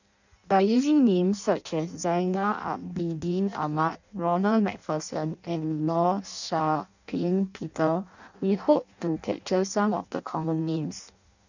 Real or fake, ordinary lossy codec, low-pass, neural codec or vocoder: fake; none; 7.2 kHz; codec, 16 kHz in and 24 kHz out, 0.6 kbps, FireRedTTS-2 codec